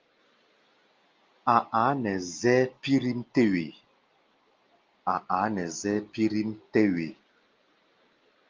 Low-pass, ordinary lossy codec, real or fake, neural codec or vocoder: 7.2 kHz; Opus, 32 kbps; real; none